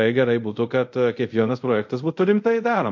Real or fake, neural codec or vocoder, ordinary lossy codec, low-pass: fake; codec, 24 kHz, 0.5 kbps, DualCodec; MP3, 48 kbps; 7.2 kHz